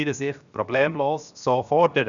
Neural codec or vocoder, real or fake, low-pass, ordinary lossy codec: codec, 16 kHz, 0.7 kbps, FocalCodec; fake; 7.2 kHz; none